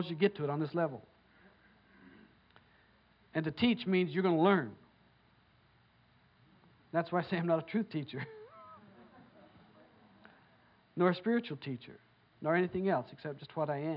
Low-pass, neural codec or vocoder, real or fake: 5.4 kHz; none; real